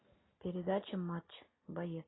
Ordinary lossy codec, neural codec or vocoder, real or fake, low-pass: AAC, 16 kbps; none; real; 7.2 kHz